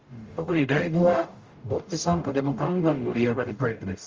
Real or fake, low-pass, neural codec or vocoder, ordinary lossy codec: fake; 7.2 kHz; codec, 44.1 kHz, 0.9 kbps, DAC; Opus, 32 kbps